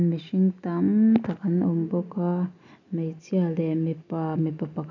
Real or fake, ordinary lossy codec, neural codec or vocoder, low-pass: real; none; none; 7.2 kHz